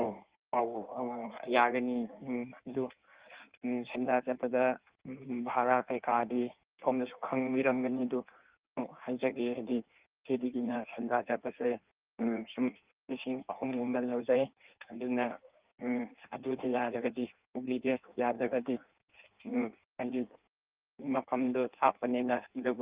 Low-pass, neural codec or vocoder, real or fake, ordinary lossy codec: 3.6 kHz; codec, 16 kHz in and 24 kHz out, 1.1 kbps, FireRedTTS-2 codec; fake; Opus, 32 kbps